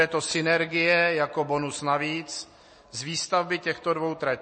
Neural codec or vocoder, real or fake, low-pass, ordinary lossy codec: none; real; 9.9 kHz; MP3, 32 kbps